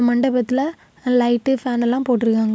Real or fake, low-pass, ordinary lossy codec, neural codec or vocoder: real; none; none; none